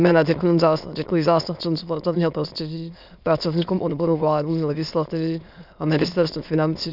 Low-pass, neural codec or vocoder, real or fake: 5.4 kHz; autoencoder, 22.05 kHz, a latent of 192 numbers a frame, VITS, trained on many speakers; fake